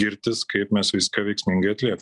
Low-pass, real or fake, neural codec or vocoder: 10.8 kHz; real; none